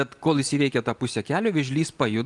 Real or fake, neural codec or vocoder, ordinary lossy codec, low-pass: real; none; Opus, 32 kbps; 10.8 kHz